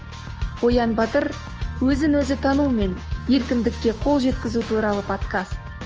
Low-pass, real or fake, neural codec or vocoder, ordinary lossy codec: 7.2 kHz; fake; codec, 16 kHz, 6 kbps, DAC; Opus, 24 kbps